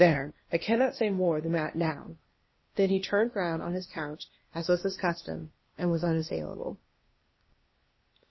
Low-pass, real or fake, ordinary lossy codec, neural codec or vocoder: 7.2 kHz; fake; MP3, 24 kbps; codec, 16 kHz, 0.8 kbps, ZipCodec